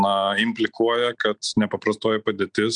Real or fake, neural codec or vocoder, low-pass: real; none; 9.9 kHz